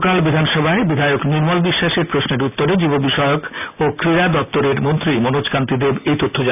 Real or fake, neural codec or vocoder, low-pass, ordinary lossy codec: real; none; 3.6 kHz; none